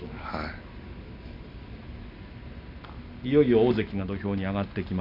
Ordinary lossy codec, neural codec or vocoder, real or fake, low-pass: none; none; real; 5.4 kHz